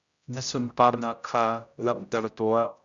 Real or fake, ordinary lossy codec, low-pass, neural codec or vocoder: fake; Opus, 64 kbps; 7.2 kHz; codec, 16 kHz, 0.5 kbps, X-Codec, HuBERT features, trained on general audio